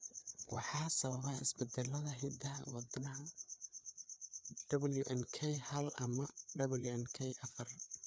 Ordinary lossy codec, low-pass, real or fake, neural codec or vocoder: none; none; fake; codec, 16 kHz, 4 kbps, FreqCodec, larger model